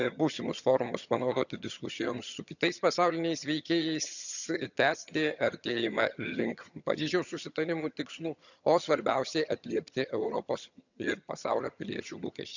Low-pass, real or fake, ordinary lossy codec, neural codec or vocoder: 7.2 kHz; fake; none; vocoder, 22.05 kHz, 80 mel bands, HiFi-GAN